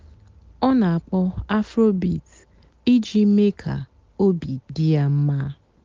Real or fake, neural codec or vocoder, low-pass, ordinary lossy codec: real; none; 7.2 kHz; Opus, 32 kbps